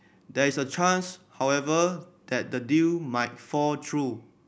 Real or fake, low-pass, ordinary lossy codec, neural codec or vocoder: real; none; none; none